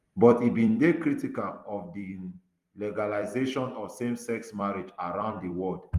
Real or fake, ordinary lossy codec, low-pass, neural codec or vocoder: fake; Opus, 32 kbps; 14.4 kHz; vocoder, 44.1 kHz, 128 mel bands every 256 samples, BigVGAN v2